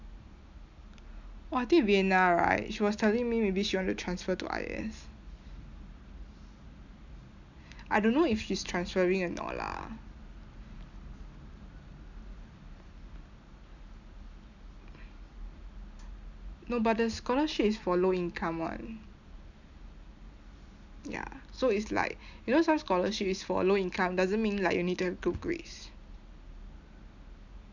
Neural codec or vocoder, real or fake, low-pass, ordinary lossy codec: none; real; 7.2 kHz; none